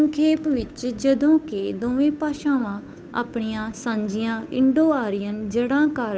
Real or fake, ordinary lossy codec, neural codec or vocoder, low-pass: fake; none; codec, 16 kHz, 8 kbps, FunCodec, trained on Chinese and English, 25 frames a second; none